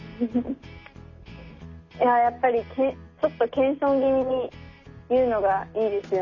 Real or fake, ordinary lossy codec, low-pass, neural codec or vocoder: real; none; 7.2 kHz; none